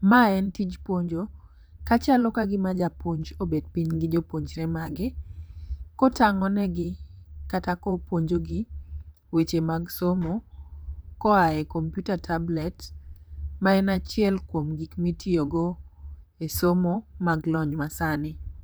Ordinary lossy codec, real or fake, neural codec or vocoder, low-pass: none; fake; vocoder, 44.1 kHz, 128 mel bands, Pupu-Vocoder; none